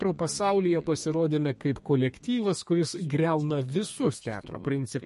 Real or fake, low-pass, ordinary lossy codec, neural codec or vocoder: fake; 14.4 kHz; MP3, 48 kbps; codec, 32 kHz, 1.9 kbps, SNAC